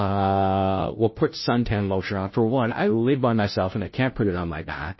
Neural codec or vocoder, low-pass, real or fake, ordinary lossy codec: codec, 16 kHz, 0.5 kbps, FunCodec, trained on Chinese and English, 25 frames a second; 7.2 kHz; fake; MP3, 24 kbps